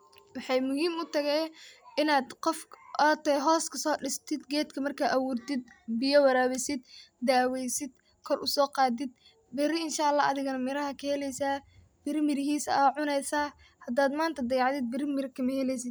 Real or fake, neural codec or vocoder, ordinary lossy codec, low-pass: real; none; none; none